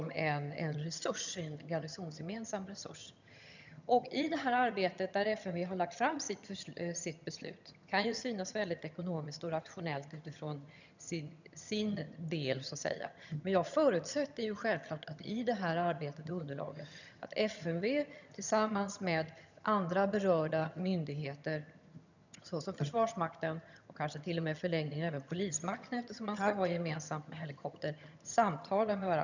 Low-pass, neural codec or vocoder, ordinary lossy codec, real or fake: 7.2 kHz; vocoder, 22.05 kHz, 80 mel bands, HiFi-GAN; none; fake